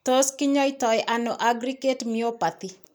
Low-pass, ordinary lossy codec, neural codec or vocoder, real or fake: none; none; none; real